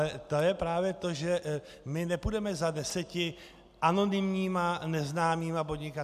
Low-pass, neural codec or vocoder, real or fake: 14.4 kHz; none; real